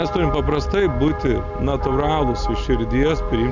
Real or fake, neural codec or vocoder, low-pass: real; none; 7.2 kHz